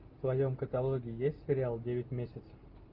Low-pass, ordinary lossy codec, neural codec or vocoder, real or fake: 5.4 kHz; Opus, 32 kbps; none; real